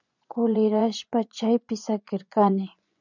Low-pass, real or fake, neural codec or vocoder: 7.2 kHz; fake; vocoder, 24 kHz, 100 mel bands, Vocos